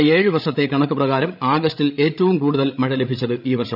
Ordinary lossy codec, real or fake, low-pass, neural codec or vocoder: none; fake; 5.4 kHz; codec, 16 kHz, 16 kbps, FreqCodec, larger model